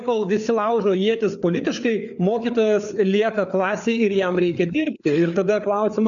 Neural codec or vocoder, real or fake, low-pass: codec, 16 kHz, 4 kbps, FreqCodec, larger model; fake; 7.2 kHz